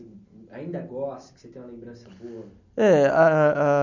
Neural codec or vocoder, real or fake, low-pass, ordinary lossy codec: none; real; 7.2 kHz; none